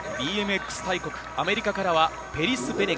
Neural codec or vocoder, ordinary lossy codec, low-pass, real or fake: none; none; none; real